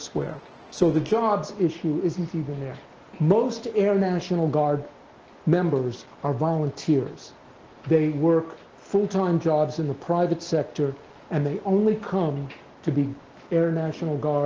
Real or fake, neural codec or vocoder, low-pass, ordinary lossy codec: real; none; 7.2 kHz; Opus, 16 kbps